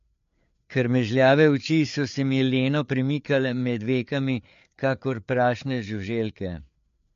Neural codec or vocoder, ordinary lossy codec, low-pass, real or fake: codec, 16 kHz, 4 kbps, FreqCodec, larger model; MP3, 48 kbps; 7.2 kHz; fake